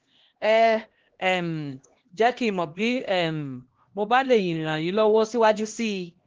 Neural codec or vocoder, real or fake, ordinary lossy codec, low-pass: codec, 16 kHz, 1 kbps, X-Codec, HuBERT features, trained on LibriSpeech; fake; Opus, 16 kbps; 7.2 kHz